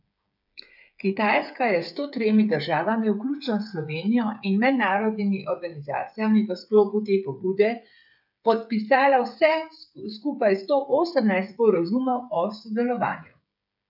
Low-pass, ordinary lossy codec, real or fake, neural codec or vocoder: 5.4 kHz; none; fake; codec, 16 kHz, 8 kbps, FreqCodec, smaller model